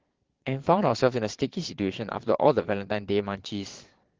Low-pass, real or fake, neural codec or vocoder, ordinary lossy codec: 7.2 kHz; fake; vocoder, 22.05 kHz, 80 mel bands, Vocos; Opus, 16 kbps